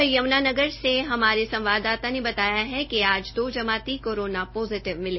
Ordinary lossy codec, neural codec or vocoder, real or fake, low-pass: MP3, 24 kbps; none; real; 7.2 kHz